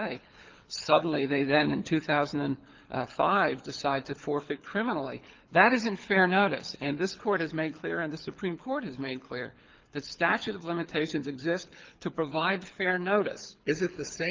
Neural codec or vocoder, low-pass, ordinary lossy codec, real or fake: codec, 24 kHz, 6 kbps, HILCodec; 7.2 kHz; Opus, 24 kbps; fake